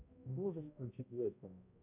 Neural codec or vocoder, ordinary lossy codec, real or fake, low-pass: codec, 16 kHz, 0.5 kbps, X-Codec, HuBERT features, trained on balanced general audio; AAC, 32 kbps; fake; 3.6 kHz